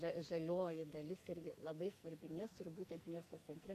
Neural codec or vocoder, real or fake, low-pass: codec, 44.1 kHz, 2.6 kbps, SNAC; fake; 14.4 kHz